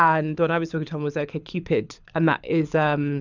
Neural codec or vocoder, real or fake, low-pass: codec, 24 kHz, 6 kbps, HILCodec; fake; 7.2 kHz